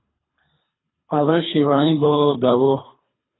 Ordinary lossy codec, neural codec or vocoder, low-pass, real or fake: AAC, 16 kbps; codec, 24 kHz, 3 kbps, HILCodec; 7.2 kHz; fake